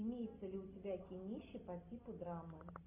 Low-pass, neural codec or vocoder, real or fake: 3.6 kHz; none; real